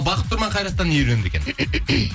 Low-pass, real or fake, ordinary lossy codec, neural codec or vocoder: none; real; none; none